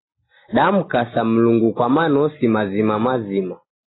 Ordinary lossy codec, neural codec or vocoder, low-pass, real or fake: AAC, 16 kbps; none; 7.2 kHz; real